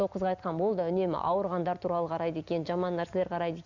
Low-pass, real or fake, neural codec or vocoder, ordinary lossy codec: 7.2 kHz; real; none; none